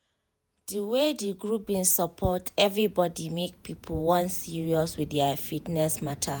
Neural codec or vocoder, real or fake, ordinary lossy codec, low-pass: vocoder, 48 kHz, 128 mel bands, Vocos; fake; none; none